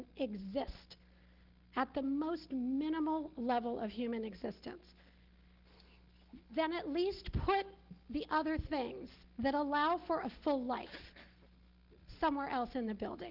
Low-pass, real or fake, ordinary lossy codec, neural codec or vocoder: 5.4 kHz; real; Opus, 16 kbps; none